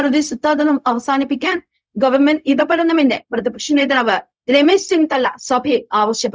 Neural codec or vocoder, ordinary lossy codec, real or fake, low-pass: codec, 16 kHz, 0.4 kbps, LongCat-Audio-Codec; none; fake; none